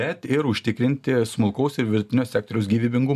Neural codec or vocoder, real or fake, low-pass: none; real; 14.4 kHz